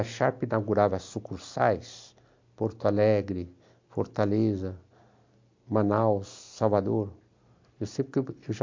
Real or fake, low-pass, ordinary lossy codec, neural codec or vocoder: real; 7.2 kHz; MP3, 48 kbps; none